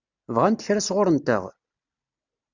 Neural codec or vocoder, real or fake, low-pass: none; real; 7.2 kHz